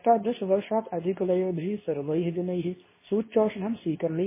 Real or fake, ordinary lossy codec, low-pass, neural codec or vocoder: fake; MP3, 16 kbps; 3.6 kHz; codec, 24 kHz, 0.9 kbps, WavTokenizer, medium speech release version 2